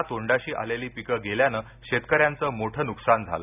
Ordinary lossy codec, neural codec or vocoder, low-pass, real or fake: none; none; 3.6 kHz; real